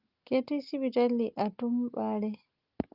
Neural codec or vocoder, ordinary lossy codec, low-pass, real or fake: none; Opus, 32 kbps; 5.4 kHz; real